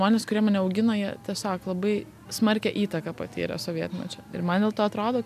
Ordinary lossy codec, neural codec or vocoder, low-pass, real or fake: MP3, 96 kbps; none; 14.4 kHz; real